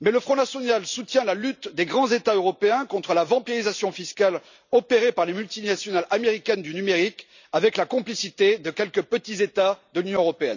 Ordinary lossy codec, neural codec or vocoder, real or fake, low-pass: none; none; real; 7.2 kHz